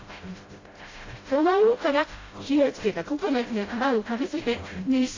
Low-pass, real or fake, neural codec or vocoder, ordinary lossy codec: 7.2 kHz; fake; codec, 16 kHz, 0.5 kbps, FreqCodec, smaller model; AAC, 32 kbps